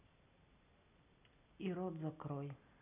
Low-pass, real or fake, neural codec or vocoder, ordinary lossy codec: 3.6 kHz; real; none; none